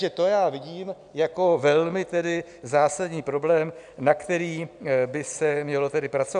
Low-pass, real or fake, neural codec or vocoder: 9.9 kHz; real; none